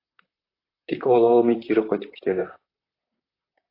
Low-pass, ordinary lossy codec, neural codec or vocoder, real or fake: 5.4 kHz; AAC, 24 kbps; vocoder, 44.1 kHz, 128 mel bands, Pupu-Vocoder; fake